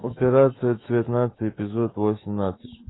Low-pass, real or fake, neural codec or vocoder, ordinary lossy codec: 7.2 kHz; fake; autoencoder, 48 kHz, 128 numbers a frame, DAC-VAE, trained on Japanese speech; AAC, 16 kbps